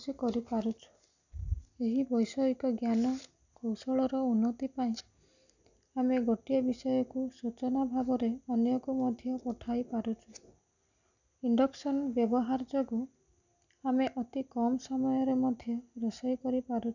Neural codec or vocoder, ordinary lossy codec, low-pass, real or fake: none; none; 7.2 kHz; real